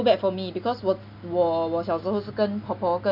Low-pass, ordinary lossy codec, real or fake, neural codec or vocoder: 5.4 kHz; none; real; none